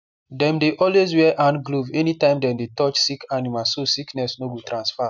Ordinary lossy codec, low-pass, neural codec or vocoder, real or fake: none; 7.2 kHz; none; real